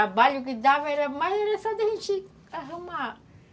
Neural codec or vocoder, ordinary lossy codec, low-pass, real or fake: none; none; none; real